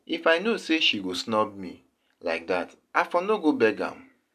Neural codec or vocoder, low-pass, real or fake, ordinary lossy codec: none; 14.4 kHz; real; none